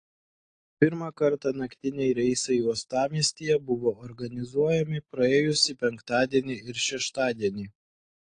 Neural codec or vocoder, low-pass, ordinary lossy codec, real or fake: none; 9.9 kHz; AAC, 48 kbps; real